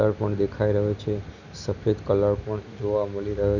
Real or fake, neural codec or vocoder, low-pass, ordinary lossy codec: real; none; 7.2 kHz; none